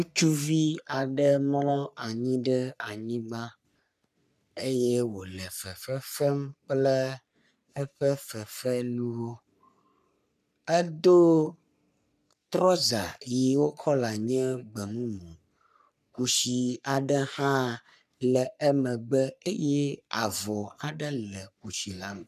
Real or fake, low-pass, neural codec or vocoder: fake; 14.4 kHz; codec, 44.1 kHz, 3.4 kbps, Pupu-Codec